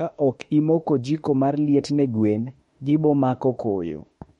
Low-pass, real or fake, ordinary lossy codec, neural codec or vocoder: 19.8 kHz; fake; MP3, 48 kbps; autoencoder, 48 kHz, 32 numbers a frame, DAC-VAE, trained on Japanese speech